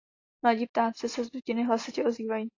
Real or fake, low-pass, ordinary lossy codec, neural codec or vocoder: real; 7.2 kHz; AAC, 32 kbps; none